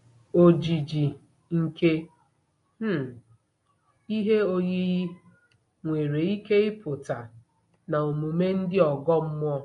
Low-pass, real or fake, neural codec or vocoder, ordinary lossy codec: 10.8 kHz; real; none; AAC, 48 kbps